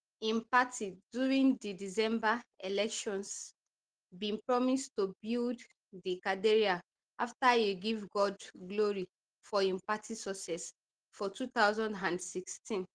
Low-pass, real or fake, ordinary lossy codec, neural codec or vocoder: 9.9 kHz; real; Opus, 16 kbps; none